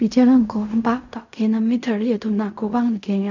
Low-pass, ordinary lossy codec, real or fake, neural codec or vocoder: 7.2 kHz; none; fake; codec, 16 kHz in and 24 kHz out, 0.4 kbps, LongCat-Audio-Codec, fine tuned four codebook decoder